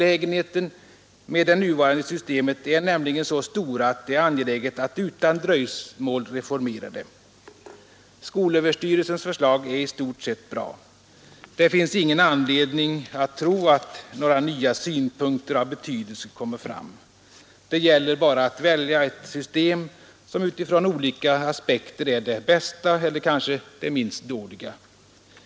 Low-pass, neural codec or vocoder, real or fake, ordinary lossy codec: none; none; real; none